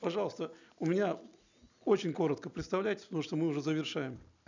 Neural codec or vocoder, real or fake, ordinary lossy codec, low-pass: none; real; none; 7.2 kHz